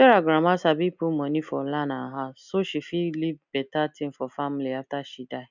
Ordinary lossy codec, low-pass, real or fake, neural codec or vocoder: none; 7.2 kHz; real; none